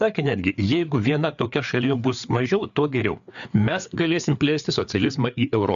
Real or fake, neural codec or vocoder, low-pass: fake; codec, 16 kHz, 4 kbps, FreqCodec, larger model; 7.2 kHz